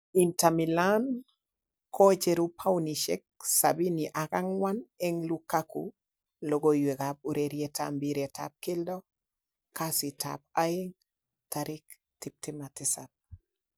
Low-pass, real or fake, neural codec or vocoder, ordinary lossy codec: none; real; none; none